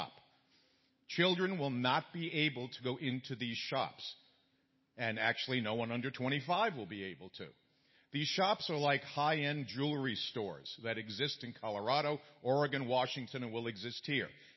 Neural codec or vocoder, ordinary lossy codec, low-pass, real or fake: none; MP3, 24 kbps; 7.2 kHz; real